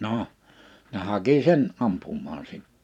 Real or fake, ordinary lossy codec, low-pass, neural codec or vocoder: fake; none; 19.8 kHz; vocoder, 44.1 kHz, 128 mel bands every 512 samples, BigVGAN v2